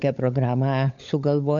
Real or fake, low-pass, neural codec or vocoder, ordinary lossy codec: fake; 7.2 kHz; codec, 16 kHz, 16 kbps, FunCodec, trained on LibriTTS, 50 frames a second; MP3, 64 kbps